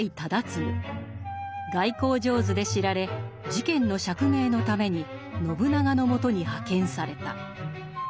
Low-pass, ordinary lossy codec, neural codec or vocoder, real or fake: none; none; none; real